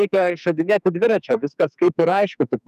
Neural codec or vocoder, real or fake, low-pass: codec, 44.1 kHz, 2.6 kbps, SNAC; fake; 14.4 kHz